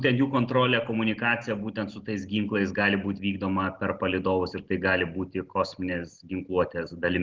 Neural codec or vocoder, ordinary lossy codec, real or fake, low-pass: none; Opus, 16 kbps; real; 7.2 kHz